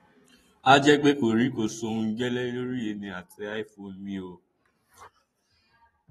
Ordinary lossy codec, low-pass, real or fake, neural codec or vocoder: AAC, 32 kbps; 19.8 kHz; fake; vocoder, 44.1 kHz, 128 mel bands every 512 samples, BigVGAN v2